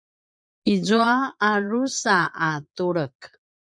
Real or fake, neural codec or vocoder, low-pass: fake; vocoder, 22.05 kHz, 80 mel bands, Vocos; 9.9 kHz